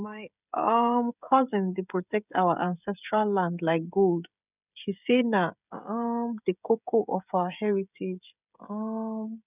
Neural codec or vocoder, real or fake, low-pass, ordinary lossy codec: codec, 16 kHz, 16 kbps, FreqCodec, smaller model; fake; 3.6 kHz; none